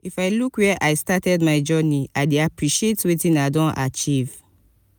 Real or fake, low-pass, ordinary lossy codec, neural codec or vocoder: real; none; none; none